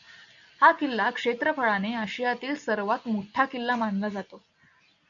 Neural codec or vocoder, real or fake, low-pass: none; real; 7.2 kHz